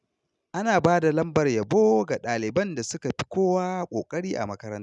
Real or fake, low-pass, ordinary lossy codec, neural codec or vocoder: real; 10.8 kHz; none; none